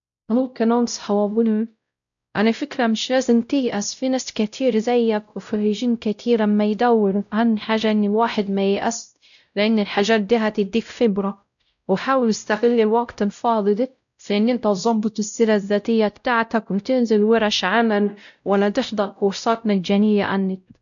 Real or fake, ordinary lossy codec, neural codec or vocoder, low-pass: fake; none; codec, 16 kHz, 0.5 kbps, X-Codec, WavLM features, trained on Multilingual LibriSpeech; 7.2 kHz